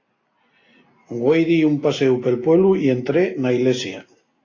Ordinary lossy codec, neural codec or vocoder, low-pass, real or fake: AAC, 32 kbps; none; 7.2 kHz; real